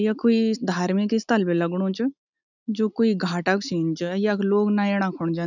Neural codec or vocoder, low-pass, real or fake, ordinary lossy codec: none; 7.2 kHz; real; none